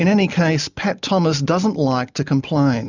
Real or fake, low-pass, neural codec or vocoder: real; 7.2 kHz; none